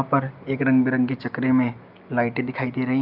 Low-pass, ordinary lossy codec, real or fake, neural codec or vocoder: 5.4 kHz; Opus, 24 kbps; real; none